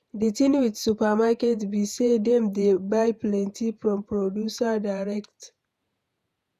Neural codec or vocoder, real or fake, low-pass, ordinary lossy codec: vocoder, 48 kHz, 128 mel bands, Vocos; fake; 14.4 kHz; none